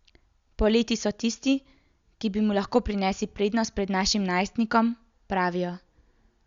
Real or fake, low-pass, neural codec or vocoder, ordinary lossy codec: real; 7.2 kHz; none; Opus, 64 kbps